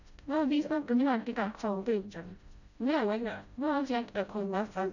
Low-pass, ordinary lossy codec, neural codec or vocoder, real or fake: 7.2 kHz; none; codec, 16 kHz, 0.5 kbps, FreqCodec, smaller model; fake